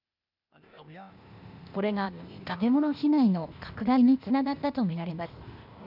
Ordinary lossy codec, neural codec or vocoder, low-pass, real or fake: none; codec, 16 kHz, 0.8 kbps, ZipCodec; 5.4 kHz; fake